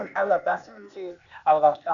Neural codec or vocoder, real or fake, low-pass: codec, 16 kHz, 0.8 kbps, ZipCodec; fake; 7.2 kHz